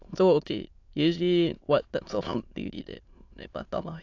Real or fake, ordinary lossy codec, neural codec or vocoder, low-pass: fake; AAC, 48 kbps; autoencoder, 22.05 kHz, a latent of 192 numbers a frame, VITS, trained on many speakers; 7.2 kHz